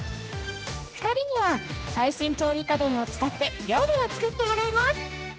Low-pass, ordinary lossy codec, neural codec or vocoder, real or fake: none; none; codec, 16 kHz, 1 kbps, X-Codec, HuBERT features, trained on general audio; fake